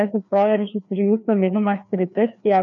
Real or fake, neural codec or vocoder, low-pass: fake; codec, 16 kHz, 2 kbps, FreqCodec, larger model; 7.2 kHz